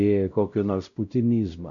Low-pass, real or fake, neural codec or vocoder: 7.2 kHz; fake; codec, 16 kHz, 0.5 kbps, X-Codec, WavLM features, trained on Multilingual LibriSpeech